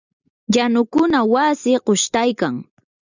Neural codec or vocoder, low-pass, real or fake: none; 7.2 kHz; real